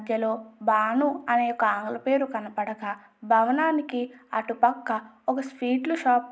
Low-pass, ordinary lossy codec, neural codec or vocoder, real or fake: none; none; none; real